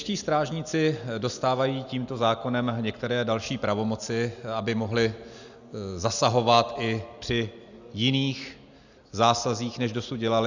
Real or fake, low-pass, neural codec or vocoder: real; 7.2 kHz; none